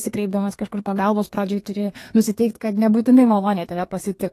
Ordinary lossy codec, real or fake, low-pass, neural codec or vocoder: AAC, 48 kbps; fake; 14.4 kHz; codec, 32 kHz, 1.9 kbps, SNAC